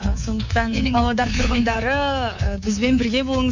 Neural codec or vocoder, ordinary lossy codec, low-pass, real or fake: codec, 24 kHz, 3.1 kbps, DualCodec; none; 7.2 kHz; fake